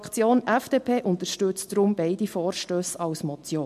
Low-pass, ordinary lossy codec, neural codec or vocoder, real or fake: 14.4 kHz; MP3, 64 kbps; none; real